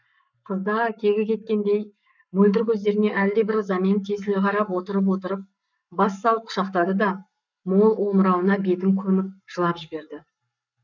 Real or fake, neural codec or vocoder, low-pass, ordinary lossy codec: fake; codec, 44.1 kHz, 7.8 kbps, Pupu-Codec; 7.2 kHz; none